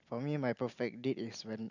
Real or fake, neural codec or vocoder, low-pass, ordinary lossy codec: real; none; 7.2 kHz; none